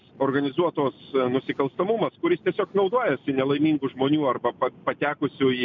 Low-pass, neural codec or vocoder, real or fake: 7.2 kHz; none; real